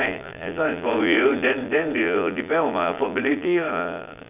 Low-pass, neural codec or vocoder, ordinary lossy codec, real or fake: 3.6 kHz; vocoder, 22.05 kHz, 80 mel bands, Vocos; none; fake